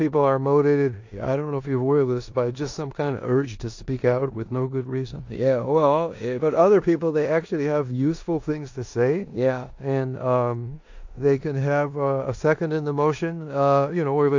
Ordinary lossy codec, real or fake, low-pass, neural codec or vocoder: AAC, 48 kbps; fake; 7.2 kHz; codec, 16 kHz in and 24 kHz out, 0.9 kbps, LongCat-Audio-Codec, four codebook decoder